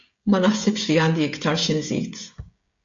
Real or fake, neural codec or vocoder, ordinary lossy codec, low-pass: fake; codec, 16 kHz, 16 kbps, FreqCodec, smaller model; MP3, 48 kbps; 7.2 kHz